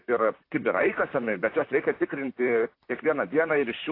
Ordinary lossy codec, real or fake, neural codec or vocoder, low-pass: AAC, 32 kbps; fake; vocoder, 44.1 kHz, 128 mel bands, Pupu-Vocoder; 5.4 kHz